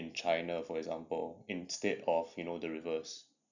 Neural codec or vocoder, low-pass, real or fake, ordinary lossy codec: none; 7.2 kHz; real; none